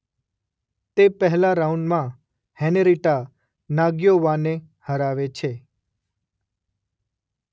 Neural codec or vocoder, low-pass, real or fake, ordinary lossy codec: none; none; real; none